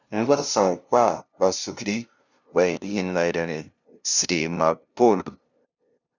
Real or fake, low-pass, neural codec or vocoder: fake; 7.2 kHz; codec, 16 kHz, 0.5 kbps, FunCodec, trained on LibriTTS, 25 frames a second